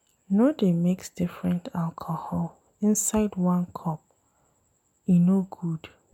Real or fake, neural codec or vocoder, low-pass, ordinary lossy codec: real; none; 19.8 kHz; none